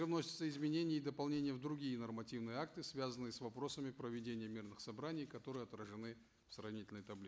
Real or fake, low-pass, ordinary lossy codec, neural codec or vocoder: real; none; none; none